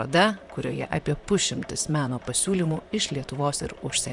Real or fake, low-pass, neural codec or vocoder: fake; 10.8 kHz; vocoder, 44.1 kHz, 128 mel bands, Pupu-Vocoder